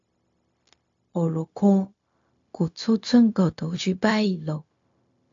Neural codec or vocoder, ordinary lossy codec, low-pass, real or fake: codec, 16 kHz, 0.4 kbps, LongCat-Audio-Codec; AAC, 64 kbps; 7.2 kHz; fake